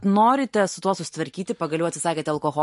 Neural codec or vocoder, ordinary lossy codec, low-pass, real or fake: none; MP3, 48 kbps; 14.4 kHz; real